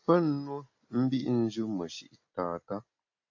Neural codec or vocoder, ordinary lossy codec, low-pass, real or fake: none; AAC, 48 kbps; 7.2 kHz; real